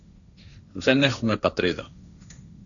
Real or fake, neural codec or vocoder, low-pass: fake; codec, 16 kHz, 1.1 kbps, Voila-Tokenizer; 7.2 kHz